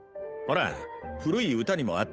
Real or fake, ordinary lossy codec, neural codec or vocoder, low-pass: fake; none; codec, 16 kHz, 8 kbps, FunCodec, trained on Chinese and English, 25 frames a second; none